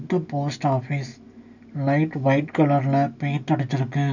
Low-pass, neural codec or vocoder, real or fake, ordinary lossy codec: 7.2 kHz; none; real; none